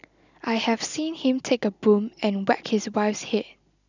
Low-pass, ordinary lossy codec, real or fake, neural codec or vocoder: 7.2 kHz; none; real; none